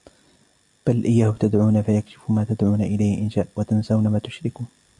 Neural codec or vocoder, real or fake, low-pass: none; real; 10.8 kHz